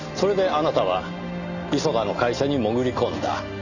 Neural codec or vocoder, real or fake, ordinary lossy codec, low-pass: none; real; none; 7.2 kHz